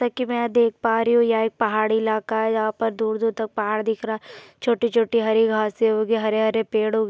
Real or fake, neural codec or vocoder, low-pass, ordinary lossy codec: real; none; none; none